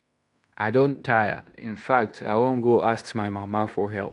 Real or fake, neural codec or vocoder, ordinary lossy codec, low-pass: fake; codec, 16 kHz in and 24 kHz out, 0.9 kbps, LongCat-Audio-Codec, fine tuned four codebook decoder; none; 10.8 kHz